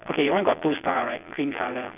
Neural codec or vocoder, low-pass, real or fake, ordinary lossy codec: vocoder, 22.05 kHz, 80 mel bands, Vocos; 3.6 kHz; fake; none